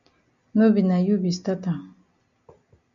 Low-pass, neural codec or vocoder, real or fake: 7.2 kHz; none; real